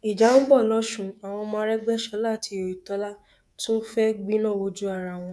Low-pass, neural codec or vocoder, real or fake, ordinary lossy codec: none; codec, 24 kHz, 3.1 kbps, DualCodec; fake; none